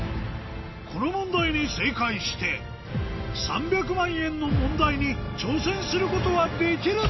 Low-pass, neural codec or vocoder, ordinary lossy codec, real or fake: 7.2 kHz; none; MP3, 24 kbps; real